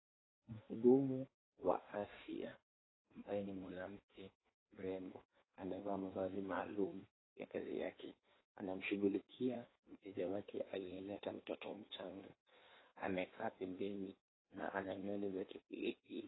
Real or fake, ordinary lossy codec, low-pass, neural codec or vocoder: fake; AAC, 16 kbps; 7.2 kHz; codec, 16 kHz in and 24 kHz out, 1.1 kbps, FireRedTTS-2 codec